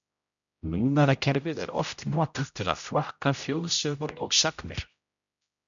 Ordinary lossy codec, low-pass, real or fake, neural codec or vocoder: MP3, 96 kbps; 7.2 kHz; fake; codec, 16 kHz, 0.5 kbps, X-Codec, HuBERT features, trained on general audio